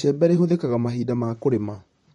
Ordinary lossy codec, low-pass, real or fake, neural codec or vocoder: MP3, 48 kbps; 19.8 kHz; fake; vocoder, 44.1 kHz, 128 mel bands, Pupu-Vocoder